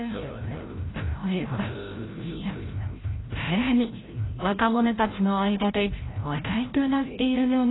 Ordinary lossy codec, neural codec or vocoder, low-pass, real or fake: AAC, 16 kbps; codec, 16 kHz, 0.5 kbps, FreqCodec, larger model; 7.2 kHz; fake